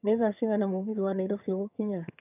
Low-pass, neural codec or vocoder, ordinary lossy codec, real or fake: 3.6 kHz; vocoder, 22.05 kHz, 80 mel bands, HiFi-GAN; none; fake